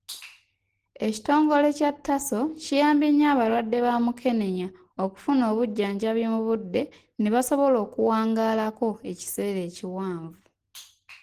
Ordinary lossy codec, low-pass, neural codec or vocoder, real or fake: Opus, 16 kbps; 14.4 kHz; none; real